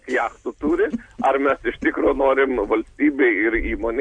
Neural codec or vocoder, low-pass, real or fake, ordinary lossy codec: none; 9.9 kHz; real; MP3, 64 kbps